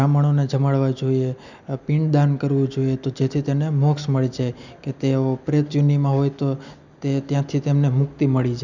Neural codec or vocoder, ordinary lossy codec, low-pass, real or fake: none; none; 7.2 kHz; real